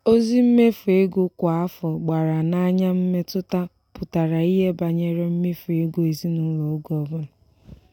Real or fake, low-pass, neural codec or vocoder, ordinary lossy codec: real; 19.8 kHz; none; none